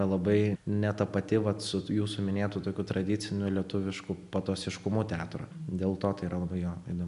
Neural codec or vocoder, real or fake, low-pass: none; real; 10.8 kHz